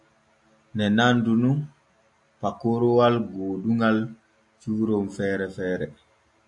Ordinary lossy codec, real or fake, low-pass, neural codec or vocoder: AAC, 64 kbps; real; 10.8 kHz; none